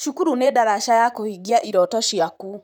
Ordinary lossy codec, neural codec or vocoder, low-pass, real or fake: none; vocoder, 44.1 kHz, 128 mel bands, Pupu-Vocoder; none; fake